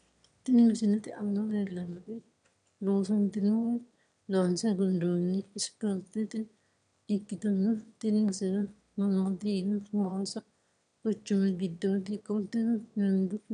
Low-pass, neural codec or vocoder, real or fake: 9.9 kHz; autoencoder, 22.05 kHz, a latent of 192 numbers a frame, VITS, trained on one speaker; fake